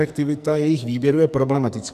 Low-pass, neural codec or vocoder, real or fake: 14.4 kHz; codec, 44.1 kHz, 2.6 kbps, SNAC; fake